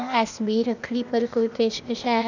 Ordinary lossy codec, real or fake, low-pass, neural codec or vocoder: none; fake; 7.2 kHz; codec, 16 kHz, 0.8 kbps, ZipCodec